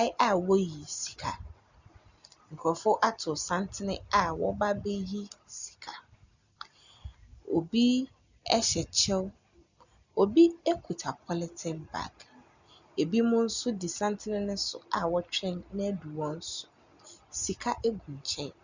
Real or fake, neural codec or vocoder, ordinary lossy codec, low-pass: real; none; Opus, 64 kbps; 7.2 kHz